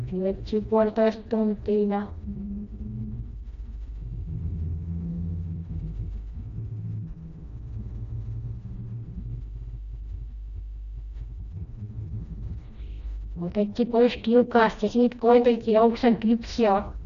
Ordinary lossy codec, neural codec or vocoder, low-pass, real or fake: AAC, 96 kbps; codec, 16 kHz, 1 kbps, FreqCodec, smaller model; 7.2 kHz; fake